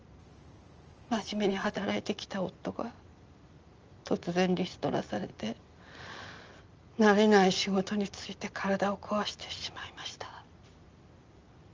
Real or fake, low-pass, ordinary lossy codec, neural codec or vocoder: real; 7.2 kHz; Opus, 24 kbps; none